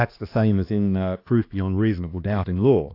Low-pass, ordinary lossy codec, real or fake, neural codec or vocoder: 5.4 kHz; AAC, 32 kbps; fake; codec, 16 kHz, 2 kbps, X-Codec, HuBERT features, trained on balanced general audio